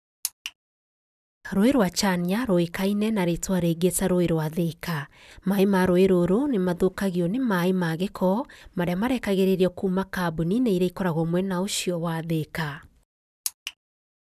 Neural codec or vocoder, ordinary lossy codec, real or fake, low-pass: none; none; real; 14.4 kHz